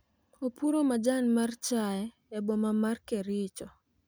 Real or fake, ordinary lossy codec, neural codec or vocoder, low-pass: real; none; none; none